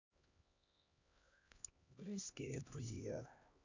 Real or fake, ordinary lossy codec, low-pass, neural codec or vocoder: fake; Opus, 64 kbps; 7.2 kHz; codec, 16 kHz, 1 kbps, X-Codec, HuBERT features, trained on LibriSpeech